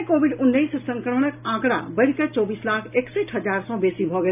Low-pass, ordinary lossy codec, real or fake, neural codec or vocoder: 3.6 kHz; none; real; none